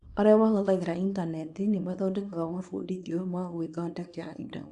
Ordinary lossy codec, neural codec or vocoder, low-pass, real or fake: Opus, 64 kbps; codec, 24 kHz, 0.9 kbps, WavTokenizer, small release; 10.8 kHz; fake